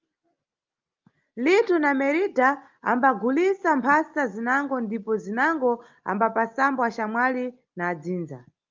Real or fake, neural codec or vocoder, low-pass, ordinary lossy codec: real; none; 7.2 kHz; Opus, 24 kbps